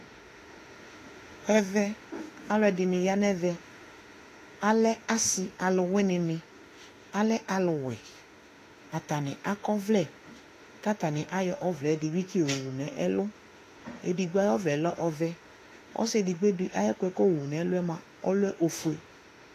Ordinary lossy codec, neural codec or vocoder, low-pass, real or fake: AAC, 48 kbps; autoencoder, 48 kHz, 32 numbers a frame, DAC-VAE, trained on Japanese speech; 14.4 kHz; fake